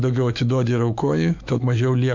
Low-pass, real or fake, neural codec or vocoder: 7.2 kHz; fake; autoencoder, 48 kHz, 128 numbers a frame, DAC-VAE, trained on Japanese speech